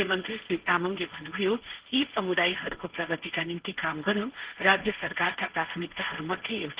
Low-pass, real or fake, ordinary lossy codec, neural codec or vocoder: 3.6 kHz; fake; Opus, 16 kbps; codec, 16 kHz, 1.1 kbps, Voila-Tokenizer